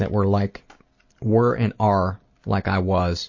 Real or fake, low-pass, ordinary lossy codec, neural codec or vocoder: real; 7.2 kHz; MP3, 32 kbps; none